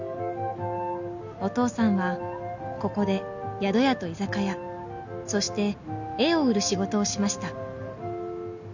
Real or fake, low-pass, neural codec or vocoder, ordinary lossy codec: real; 7.2 kHz; none; none